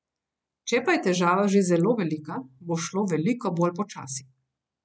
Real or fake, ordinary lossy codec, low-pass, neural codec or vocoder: real; none; none; none